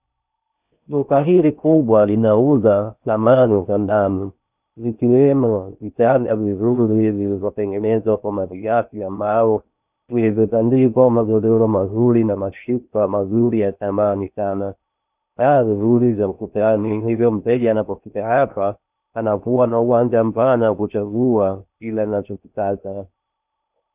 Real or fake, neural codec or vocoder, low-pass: fake; codec, 16 kHz in and 24 kHz out, 0.6 kbps, FocalCodec, streaming, 4096 codes; 3.6 kHz